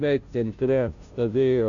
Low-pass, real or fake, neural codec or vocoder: 7.2 kHz; fake; codec, 16 kHz, 0.5 kbps, FunCodec, trained on Chinese and English, 25 frames a second